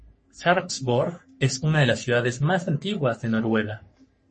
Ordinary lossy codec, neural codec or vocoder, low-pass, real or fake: MP3, 32 kbps; codec, 44.1 kHz, 3.4 kbps, Pupu-Codec; 10.8 kHz; fake